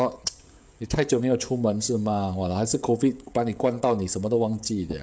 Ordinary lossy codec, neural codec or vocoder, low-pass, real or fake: none; codec, 16 kHz, 16 kbps, FreqCodec, smaller model; none; fake